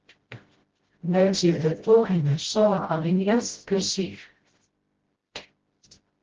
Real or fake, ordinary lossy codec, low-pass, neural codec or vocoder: fake; Opus, 16 kbps; 7.2 kHz; codec, 16 kHz, 0.5 kbps, FreqCodec, smaller model